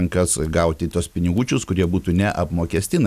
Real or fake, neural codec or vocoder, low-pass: real; none; 14.4 kHz